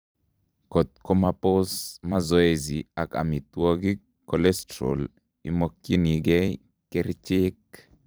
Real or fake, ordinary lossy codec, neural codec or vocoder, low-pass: fake; none; vocoder, 44.1 kHz, 128 mel bands every 256 samples, BigVGAN v2; none